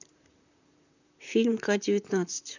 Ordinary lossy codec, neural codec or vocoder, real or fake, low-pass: none; vocoder, 44.1 kHz, 128 mel bands, Pupu-Vocoder; fake; 7.2 kHz